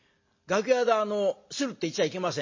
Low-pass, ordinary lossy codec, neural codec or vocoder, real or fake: 7.2 kHz; MP3, 32 kbps; none; real